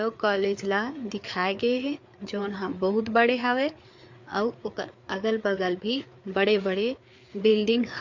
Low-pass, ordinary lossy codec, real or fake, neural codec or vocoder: 7.2 kHz; MP3, 48 kbps; fake; codec, 16 kHz, 4 kbps, FreqCodec, larger model